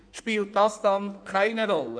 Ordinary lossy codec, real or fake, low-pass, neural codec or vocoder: MP3, 96 kbps; fake; 9.9 kHz; codec, 16 kHz in and 24 kHz out, 1.1 kbps, FireRedTTS-2 codec